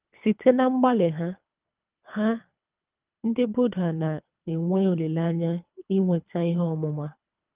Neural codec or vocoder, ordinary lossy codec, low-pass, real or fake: codec, 24 kHz, 3 kbps, HILCodec; Opus, 24 kbps; 3.6 kHz; fake